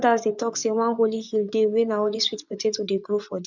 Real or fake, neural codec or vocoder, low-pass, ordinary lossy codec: fake; vocoder, 24 kHz, 100 mel bands, Vocos; 7.2 kHz; none